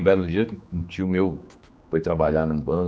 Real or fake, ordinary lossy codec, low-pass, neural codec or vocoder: fake; none; none; codec, 16 kHz, 2 kbps, X-Codec, HuBERT features, trained on general audio